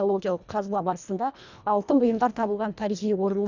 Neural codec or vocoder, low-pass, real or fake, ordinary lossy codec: codec, 24 kHz, 1.5 kbps, HILCodec; 7.2 kHz; fake; none